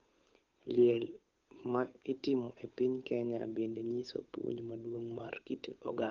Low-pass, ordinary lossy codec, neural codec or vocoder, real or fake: 7.2 kHz; Opus, 32 kbps; codec, 16 kHz, 8 kbps, FunCodec, trained on Chinese and English, 25 frames a second; fake